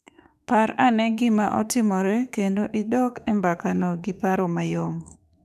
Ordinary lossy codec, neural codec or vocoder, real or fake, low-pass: none; autoencoder, 48 kHz, 32 numbers a frame, DAC-VAE, trained on Japanese speech; fake; 14.4 kHz